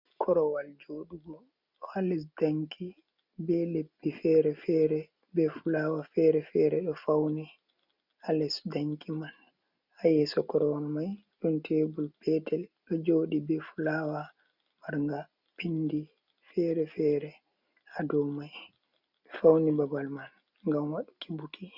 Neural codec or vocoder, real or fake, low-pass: none; real; 5.4 kHz